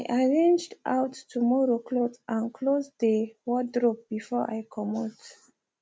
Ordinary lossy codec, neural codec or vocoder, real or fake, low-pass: none; none; real; none